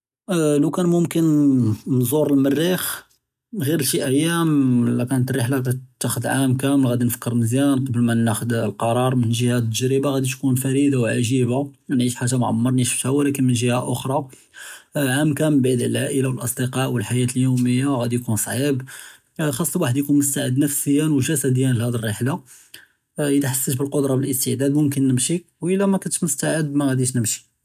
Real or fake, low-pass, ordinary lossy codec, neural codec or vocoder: real; 14.4 kHz; none; none